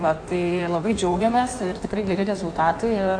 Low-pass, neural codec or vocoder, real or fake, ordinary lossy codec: 9.9 kHz; codec, 16 kHz in and 24 kHz out, 1.1 kbps, FireRedTTS-2 codec; fake; AAC, 64 kbps